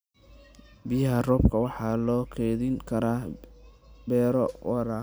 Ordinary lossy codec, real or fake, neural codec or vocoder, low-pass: none; real; none; none